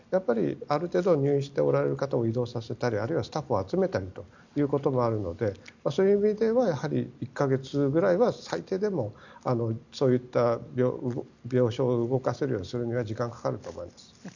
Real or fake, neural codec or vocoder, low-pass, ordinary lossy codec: real; none; 7.2 kHz; none